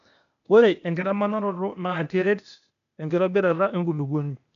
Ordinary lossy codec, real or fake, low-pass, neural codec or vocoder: none; fake; 7.2 kHz; codec, 16 kHz, 0.8 kbps, ZipCodec